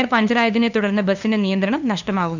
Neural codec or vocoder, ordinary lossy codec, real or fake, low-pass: codec, 16 kHz, 2 kbps, FunCodec, trained on LibriTTS, 25 frames a second; none; fake; 7.2 kHz